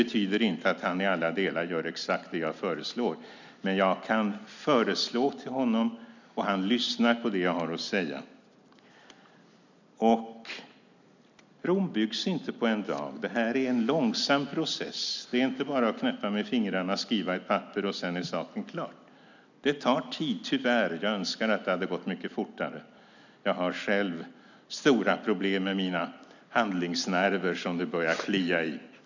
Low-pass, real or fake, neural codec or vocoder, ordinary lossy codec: 7.2 kHz; real; none; none